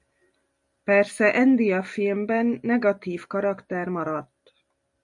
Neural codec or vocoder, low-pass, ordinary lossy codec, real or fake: none; 10.8 kHz; MP3, 64 kbps; real